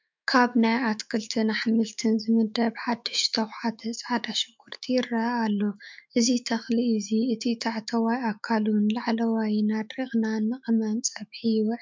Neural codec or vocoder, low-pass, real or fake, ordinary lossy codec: autoencoder, 48 kHz, 128 numbers a frame, DAC-VAE, trained on Japanese speech; 7.2 kHz; fake; MP3, 64 kbps